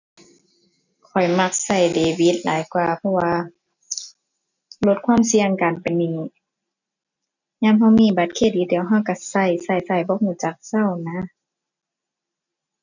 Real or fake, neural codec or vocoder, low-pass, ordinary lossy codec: real; none; 7.2 kHz; none